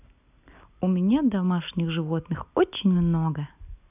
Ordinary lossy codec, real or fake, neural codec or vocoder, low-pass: none; real; none; 3.6 kHz